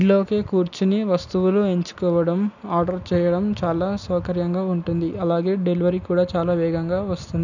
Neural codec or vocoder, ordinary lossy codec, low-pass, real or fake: none; none; 7.2 kHz; real